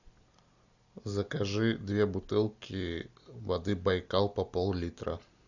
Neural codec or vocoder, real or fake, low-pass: none; real; 7.2 kHz